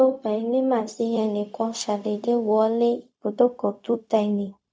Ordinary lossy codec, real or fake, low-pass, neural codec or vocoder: none; fake; none; codec, 16 kHz, 0.4 kbps, LongCat-Audio-Codec